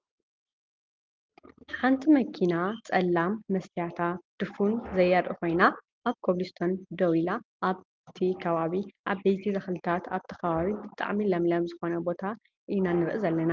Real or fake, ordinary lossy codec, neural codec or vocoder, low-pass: real; Opus, 32 kbps; none; 7.2 kHz